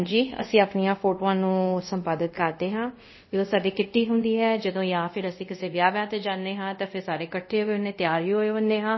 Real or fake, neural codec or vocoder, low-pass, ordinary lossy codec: fake; codec, 24 kHz, 0.5 kbps, DualCodec; 7.2 kHz; MP3, 24 kbps